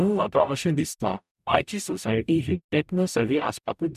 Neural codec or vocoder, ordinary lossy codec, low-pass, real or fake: codec, 44.1 kHz, 0.9 kbps, DAC; none; 14.4 kHz; fake